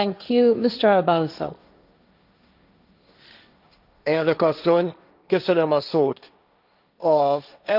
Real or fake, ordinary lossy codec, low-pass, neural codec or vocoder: fake; none; 5.4 kHz; codec, 16 kHz, 1.1 kbps, Voila-Tokenizer